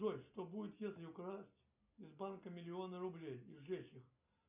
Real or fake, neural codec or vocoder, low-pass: real; none; 3.6 kHz